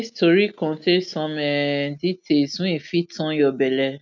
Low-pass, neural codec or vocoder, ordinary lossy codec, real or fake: 7.2 kHz; none; none; real